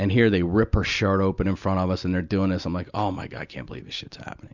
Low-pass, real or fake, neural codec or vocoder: 7.2 kHz; real; none